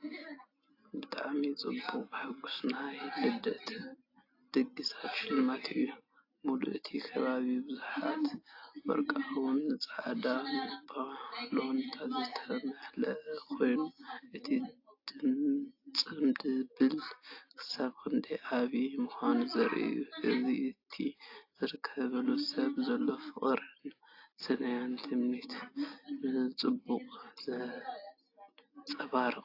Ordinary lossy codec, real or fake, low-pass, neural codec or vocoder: AAC, 32 kbps; real; 5.4 kHz; none